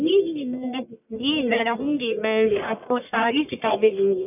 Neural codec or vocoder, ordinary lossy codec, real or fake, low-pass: codec, 44.1 kHz, 1.7 kbps, Pupu-Codec; none; fake; 3.6 kHz